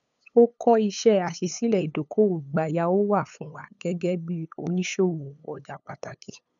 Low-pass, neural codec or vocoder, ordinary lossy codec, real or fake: 7.2 kHz; codec, 16 kHz, 8 kbps, FunCodec, trained on LibriTTS, 25 frames a second; none; fake